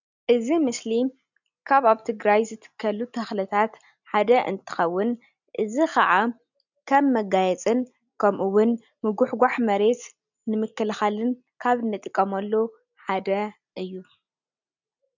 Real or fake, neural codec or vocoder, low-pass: real; none; 7.2 kHz